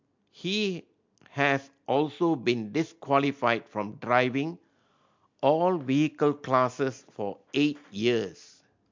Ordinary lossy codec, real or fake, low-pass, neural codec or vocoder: MP3, 48 kbps; real; 7.2 kHz; none